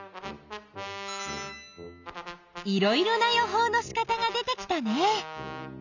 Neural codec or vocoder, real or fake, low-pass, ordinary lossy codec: none; real; 7.2 kHz; none